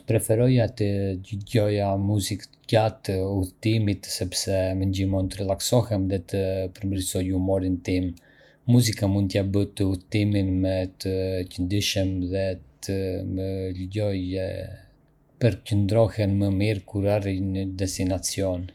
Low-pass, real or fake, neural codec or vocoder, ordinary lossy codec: 19.8 kHz; fake; vocoder, 48 kHz, 128 mel bands, Vocos; none